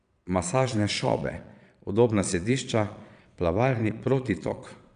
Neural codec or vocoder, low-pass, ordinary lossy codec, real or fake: vocoder, 22.05 kHz, 80 mel bands, Vocos; 9.9 kHz; none; fake